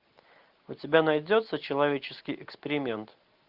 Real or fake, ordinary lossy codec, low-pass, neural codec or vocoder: real; Opus, 32 kbps; 5.4 kHz; none